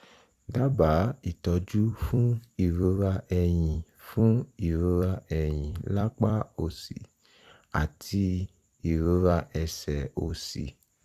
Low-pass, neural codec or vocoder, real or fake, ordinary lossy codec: 14.4 kHz; vocoder, 44.1 kHz, 128 mel bands every 256 samples, BigVGAN v2; fake; Opus, 64 kbps